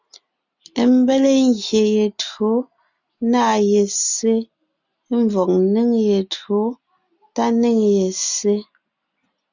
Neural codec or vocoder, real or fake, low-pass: none; real; 7.2 kHz